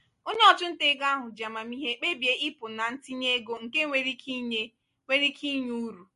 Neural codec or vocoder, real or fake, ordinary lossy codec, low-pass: none; real; MP3, 48 kbps; 14.4 kHz